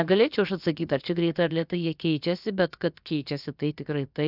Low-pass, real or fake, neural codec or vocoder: 5.4 kHz; fake; codec, 16 kHz, about 1 kbps, DyCAST, with the encoder's durations